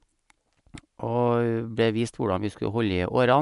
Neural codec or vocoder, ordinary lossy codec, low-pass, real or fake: none; MP3, 96 kbps; 10.8 kHz; real